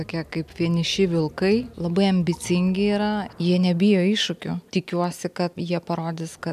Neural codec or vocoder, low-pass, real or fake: none; 14.4 kHz; real